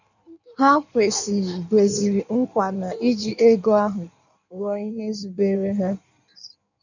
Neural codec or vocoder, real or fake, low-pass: codec, 16 kHz in and 24 kHz out, 1.1 kbps, FireRedTTS-2 codec; fake; 7.2 kHz